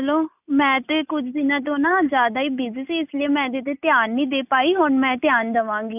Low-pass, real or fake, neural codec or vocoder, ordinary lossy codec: 3.6 kHz; real; none; none